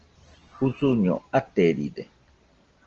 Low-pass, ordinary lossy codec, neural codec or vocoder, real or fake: 7.2 kHz; Opus, 16 kbps; none; real